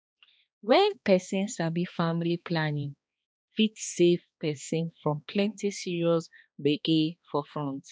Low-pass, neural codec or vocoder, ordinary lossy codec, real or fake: none; codec, 16 kHz, 2 kbps, X-Codec, HuBERT features, trained on balanced general audio; none; fake